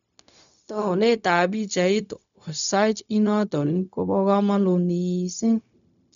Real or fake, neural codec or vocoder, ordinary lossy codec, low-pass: fake; codec, 16 kHz, 0.4 kbps, LongCat-Audio-Codec; none; 7.2 kHz